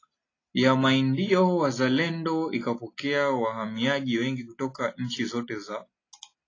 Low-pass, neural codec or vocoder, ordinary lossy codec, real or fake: 7.2 kHz; none; AAC, 32 kbps; real